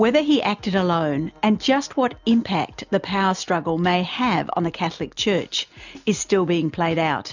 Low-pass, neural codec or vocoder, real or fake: 7.2 kHz; none; real